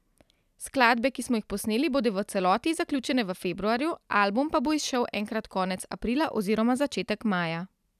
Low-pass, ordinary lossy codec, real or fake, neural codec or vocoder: 14.4 kHz; none; real; none